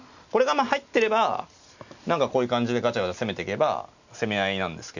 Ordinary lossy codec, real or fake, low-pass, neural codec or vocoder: none; real; 7.2 kHz; none